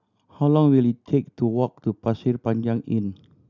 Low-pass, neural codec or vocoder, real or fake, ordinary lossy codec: 7.2 kHz; none; real; none